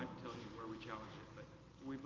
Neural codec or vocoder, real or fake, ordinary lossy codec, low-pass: none; real; Opus, 24 kbps; 7.2 kHz